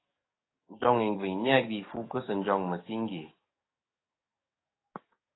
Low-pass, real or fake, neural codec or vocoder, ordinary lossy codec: 7.2 kHz; fake; codec, 44.1 kHz, 7.8 kbps, DAC; AAC, 16 kbps